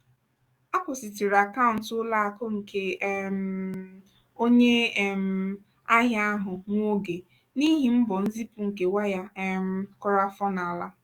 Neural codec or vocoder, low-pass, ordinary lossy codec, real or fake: codec, 44.1 kHz, 7.8 kbps, DAC; 19.8 kHz; Opus, 64 kbps; fake